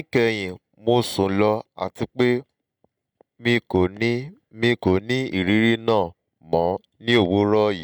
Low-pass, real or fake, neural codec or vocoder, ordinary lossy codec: 19.8 kHz; real; none; none